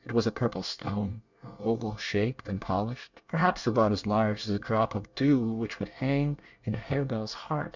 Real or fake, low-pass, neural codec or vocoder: fake; 7.2 kHz; codec, 24 kHz, 1 kbps, SNAC